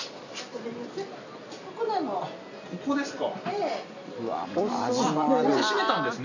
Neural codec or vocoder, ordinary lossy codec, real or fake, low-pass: none; none; real; 7.2 kHz